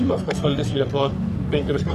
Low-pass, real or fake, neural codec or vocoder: 14.4 kHz; fake; codec, 44.1 kHz, 3.4 kbps, Pupu-Codec